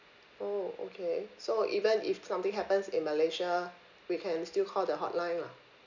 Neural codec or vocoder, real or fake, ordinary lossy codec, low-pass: none; real; none; 7.2 kHz